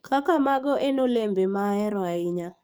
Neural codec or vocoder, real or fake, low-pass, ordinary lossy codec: codec, 44.1 kHz, 7.8 kbps, DAC; fake; none; none